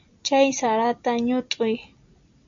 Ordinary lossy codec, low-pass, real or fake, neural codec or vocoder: MP3, 64 kbps; 7.2 kHz; real; none